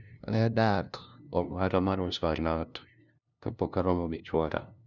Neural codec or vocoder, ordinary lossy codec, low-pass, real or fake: codec, 16 kHz, 0.5 kbps, FunCodec, trained on LibriTTS, 25 frames a second; none; 7.2 kHz; fake